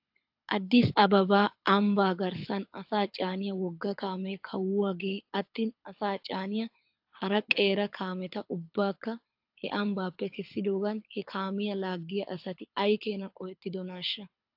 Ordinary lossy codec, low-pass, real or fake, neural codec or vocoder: AAC, 48 kbps; 5.4 kHz; fake; codec, 24 kHz, 6 kbps, HILCodec